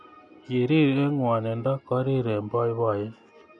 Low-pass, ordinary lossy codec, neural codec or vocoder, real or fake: 9.9 kHz; none; none; real